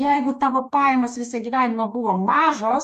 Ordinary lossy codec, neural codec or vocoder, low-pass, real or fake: MP3, 96 kbps; codec, 44.1 kHz, 2.6 kbps, DAC; 14.4 kHz; fake